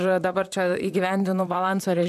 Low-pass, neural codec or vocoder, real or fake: 14.4 kHz; vocoder, 44.1 kHz, 128 mel bands, Pupu-Vocoder; fake